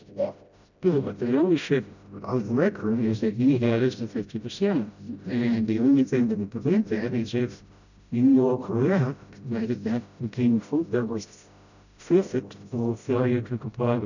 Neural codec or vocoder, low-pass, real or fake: codec, 16 kHz, 0.5 kbps, FreqCodec, smaller model; 7.2 kHz; fake